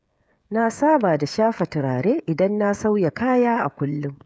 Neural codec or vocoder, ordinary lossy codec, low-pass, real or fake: codec, 16 kHz, 16 kbps, FreqCodec, smaller model; none; none; fake